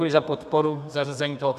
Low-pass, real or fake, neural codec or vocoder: 14.4 kHz; fake; codec, 32 kHz, 1.9 kbps, SNAC